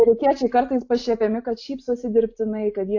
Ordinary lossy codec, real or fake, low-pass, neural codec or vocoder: AAC, 48 kbps; real; 7.2 kHz; none